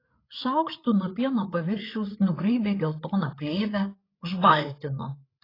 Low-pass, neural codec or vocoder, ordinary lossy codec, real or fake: 5.4 kHz; codec, 16 kHz, 8 kbps, FreqCodec, larger model; AAC, 24 kbps; fake